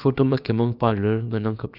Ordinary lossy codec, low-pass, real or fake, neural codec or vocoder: none; 5.4 kHz; fake; codec, 16 kHz, about 1 kbps, DyCAST, with the encoder's durations